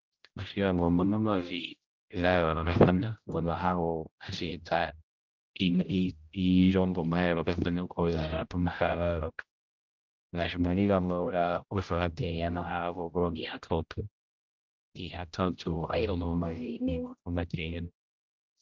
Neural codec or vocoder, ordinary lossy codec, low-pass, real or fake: codec, 16 kHz, 0.5 kbps, X-Codec, HuBERT features, trained on general audio; Opus, 24 kbps; 7.2 kHz; fake